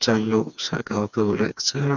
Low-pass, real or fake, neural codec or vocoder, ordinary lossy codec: 7.2 kHz; fake; codec, 16 kHz, 2 kbps, FreqCodec, smaller model; none